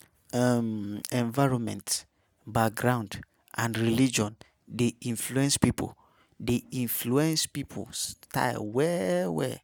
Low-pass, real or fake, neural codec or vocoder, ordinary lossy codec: none; real; none; none